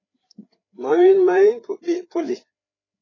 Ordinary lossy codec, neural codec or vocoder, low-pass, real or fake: AAC, 32 kbps; codec, 16 kHz, 4 kbps, FreqCodec, larger model; 7.2 kHz; fake